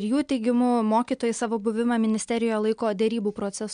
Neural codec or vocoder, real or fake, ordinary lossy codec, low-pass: none; real; MP3, 64 kbps; 9.9 kHz